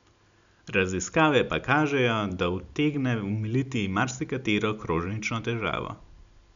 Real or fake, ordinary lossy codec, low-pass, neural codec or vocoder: real; none; 7.2 kHz; none